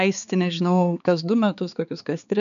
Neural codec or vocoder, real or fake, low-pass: codec, 16 kHz, 4 kbps, X-Codec, HuBERT features, trained on balanced general audio; fake; 7.2 kHz